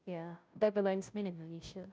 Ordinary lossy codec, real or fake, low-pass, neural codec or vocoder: none; fake; none; codec, 16 kHz, 0.5 kbps, FunCodec, trained on Chinese and English, 25 frames a second